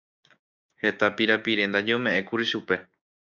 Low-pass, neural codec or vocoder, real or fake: 7.2 kHz; codec, 16 kHz, 6 kbps, DAC; fake